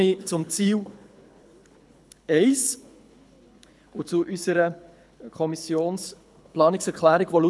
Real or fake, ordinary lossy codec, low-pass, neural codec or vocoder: fake; none; none; codec, 24 kHz, 6 kbps, HILCodec